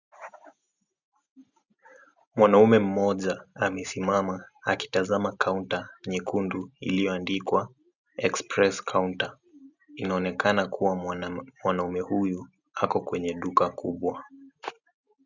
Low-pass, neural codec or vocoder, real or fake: 7.2 kHz; none; real